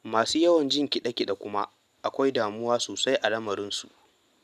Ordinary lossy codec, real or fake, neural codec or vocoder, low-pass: none; real; none; 14.4 kHz